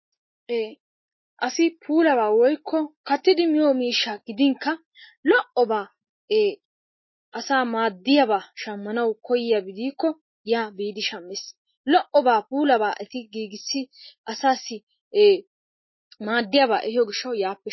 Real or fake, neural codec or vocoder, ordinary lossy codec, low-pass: real; none; MP3, 24 kbps; 7.2 kHz